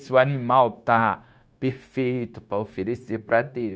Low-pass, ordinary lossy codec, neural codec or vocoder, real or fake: none; none; codec, 16 kHz, 0.9 kbps, LongCat-Audio-Codec; fake